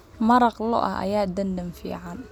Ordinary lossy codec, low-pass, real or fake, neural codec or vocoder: none; 19.8 kHz; real; none